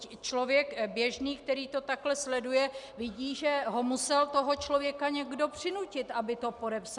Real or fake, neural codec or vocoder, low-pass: real; none; 10.8 kHz